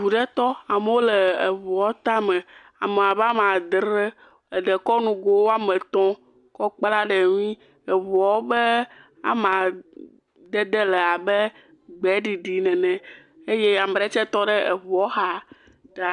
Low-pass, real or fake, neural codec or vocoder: 10.8 kHz; real; none